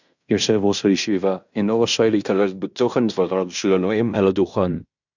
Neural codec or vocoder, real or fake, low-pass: codec, 16 kHz in and 24 kHz out, 0.9 kbps, LongCat-Audio-Codec, fine tuned four codebook decoder; fake; 7.2 kHz